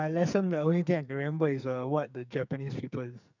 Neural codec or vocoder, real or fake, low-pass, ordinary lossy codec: codec, 44.1 kHz, 2.6 kbps, SNAC; fake; 7.2 kHz; none